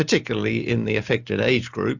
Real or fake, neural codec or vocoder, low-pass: real; none; 7.2 kHz